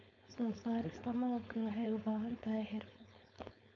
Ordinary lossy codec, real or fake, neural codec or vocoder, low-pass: none; fake; codec, 16 kHz, 4.8 kbps, FACodec; 7.2 kHz